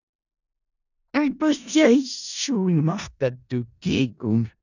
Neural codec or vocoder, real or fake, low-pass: codec, 16 kHz in and 24 kHz out, 0.4 kbps, LongCat-Audio-Codec, four codebook decoder; fake; 7.2 kHz